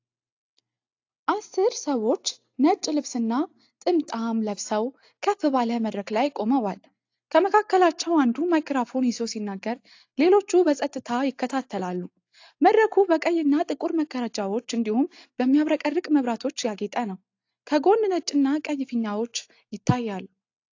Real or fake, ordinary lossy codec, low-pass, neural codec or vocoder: real; AAC, 48 kbps; 7.2 kHz; none